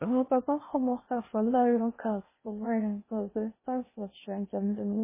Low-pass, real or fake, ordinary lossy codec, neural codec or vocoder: 3.6 kHz; fake; MP3, 16 kbps; codec, 16 kHz in and 24 kHz out, 0.6 kbps, FocalCodec, streaming, 2048 codes